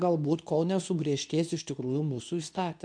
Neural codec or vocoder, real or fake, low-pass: codec, 24 kHz, 0.9 kbps, WavTokenizer, medium speech release version 2; fake; 9.9 kHz